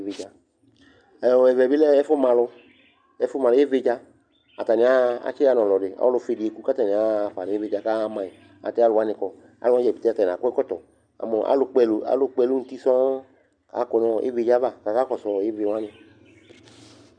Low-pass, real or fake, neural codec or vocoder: 9.9 kHz; real; none